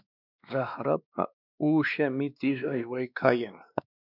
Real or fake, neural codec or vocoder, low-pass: fake; codec, 16 kHz, 2 kbps, X-Codec, WavLM features, trained on Multilingual LibriSpeech; 5.4 kHz